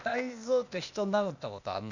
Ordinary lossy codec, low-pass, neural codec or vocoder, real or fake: none; 7.2 kHz; codec, 16 kHz, 0.8 kbps, ZipCodec; fake